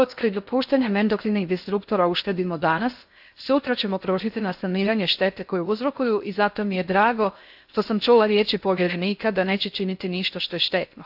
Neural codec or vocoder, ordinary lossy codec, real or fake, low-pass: codec, 16 kHz in and 24 kHz out, 0.6 kbps, FocalCodec, streaming, 4096 codes; AAC, 48 kbps; fake; 5.4 kHz